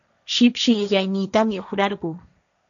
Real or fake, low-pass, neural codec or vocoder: fake; 7.2 kHz; codec, 16 kHz, 1.1 kbps, Voila-Tokenizer